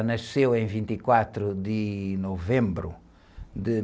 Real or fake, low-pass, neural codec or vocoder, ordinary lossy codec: real; none; none; none